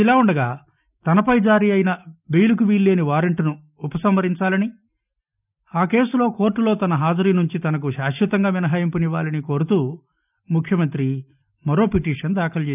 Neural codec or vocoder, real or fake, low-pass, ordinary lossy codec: none; real; 3.6 kHz; none